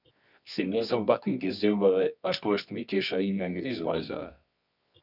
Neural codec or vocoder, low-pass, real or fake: codec, 24 kHz, 0.9 kbps, WavTokenizer, medium music audio release; 5.4 kHz; fake